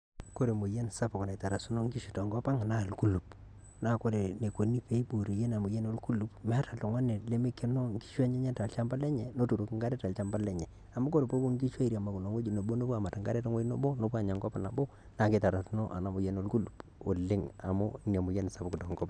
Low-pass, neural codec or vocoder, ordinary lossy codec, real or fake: 9.9 kHz; none; none; real